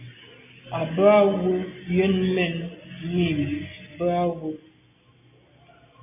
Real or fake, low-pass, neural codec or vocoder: real; 3.6 kHz; none